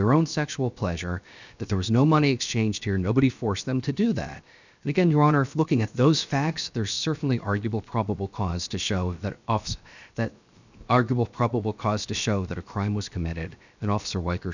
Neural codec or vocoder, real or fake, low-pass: codec, 16 kHz, 0.7 kbps, FocalCodec; fake; 7.2 kHz